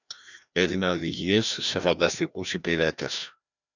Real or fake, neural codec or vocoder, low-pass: fake; codec, 16 kHz, 1 kbps, FreqCodec, larger model; 7.2 kHz